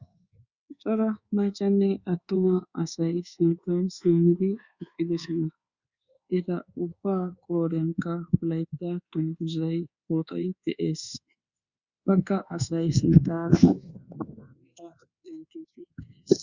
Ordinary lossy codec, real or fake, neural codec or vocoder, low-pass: Opus, 64 kbps; fake; codec, 24 kHz, 1.2 kbps, DualCodec; 7.2 kHz